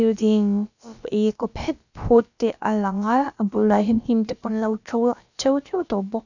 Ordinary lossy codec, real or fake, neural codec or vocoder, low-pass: none; fake; codec, 16 kHz, about 1 kbps, DyCAST, with the encoder's durations; 7.2 kHz